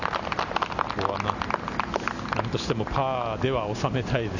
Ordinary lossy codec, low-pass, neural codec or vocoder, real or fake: none; 7.2 kHz; none; real